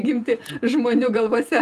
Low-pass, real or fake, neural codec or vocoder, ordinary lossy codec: 14.4 kHz; fake; vocoder, 48 kHz, 128 mel bands, Vocos; Opus, 32 kbps